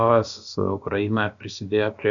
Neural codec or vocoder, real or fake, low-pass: codec, 16 kHz, about 1 kbps, DyCAST, with the encoder's durations; fake; 7.2 kHz